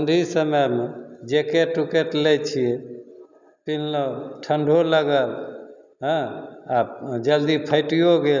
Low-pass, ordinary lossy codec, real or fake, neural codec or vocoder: 7.2 kHz; none; real; none